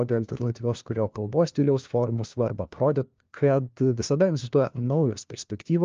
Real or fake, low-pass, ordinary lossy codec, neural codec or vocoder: fake; 7.2 kHz; Opus, 24 kbps; codec, 16 kHz, 1 kbps, FunCodec, trained on LibriTTS, 50 frames a second